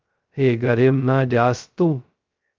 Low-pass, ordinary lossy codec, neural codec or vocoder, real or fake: 7.2 kHz; Opus, 32 kbps; codec, 16 kHz, 0.2 kbps, FocalCodec; fake